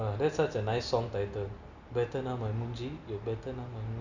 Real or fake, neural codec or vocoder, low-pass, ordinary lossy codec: real; none; 7.2 kHz; none